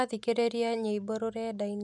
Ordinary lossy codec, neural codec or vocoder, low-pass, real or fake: none; none; none; real